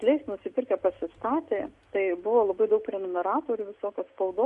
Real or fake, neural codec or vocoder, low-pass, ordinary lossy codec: real; none; 10.8 kHz; MP3, 64 kbps